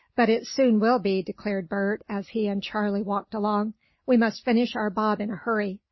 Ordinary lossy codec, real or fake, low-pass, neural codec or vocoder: MP3, 24 kbps; real; 7.2 kHz; none